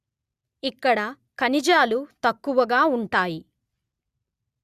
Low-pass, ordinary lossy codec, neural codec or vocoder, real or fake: 14.4 kHz; Opus, 64 kbps; none; real